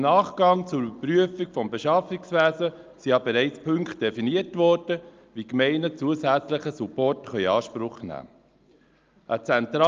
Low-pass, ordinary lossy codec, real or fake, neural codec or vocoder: 7.2 kHz; Opus, 24 kbps; real; none